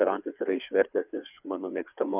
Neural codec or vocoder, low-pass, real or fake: codec, 16 kHz, 4 kbps, FreqCodec, larger model; 3.6 kHz; fake